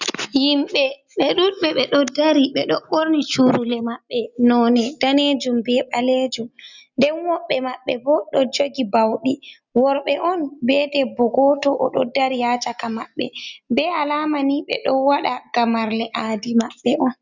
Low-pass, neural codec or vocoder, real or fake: 7.2 kHz; none; real